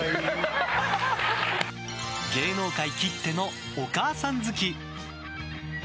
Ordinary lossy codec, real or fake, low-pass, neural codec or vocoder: none; real; none; none